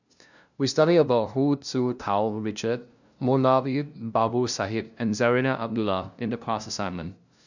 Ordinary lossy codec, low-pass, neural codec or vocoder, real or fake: none; 7.2 kHz; codec, 16 kHz, 0.5 kbps, FunCodec, trained on LibriTTS, 25 frames a second; fake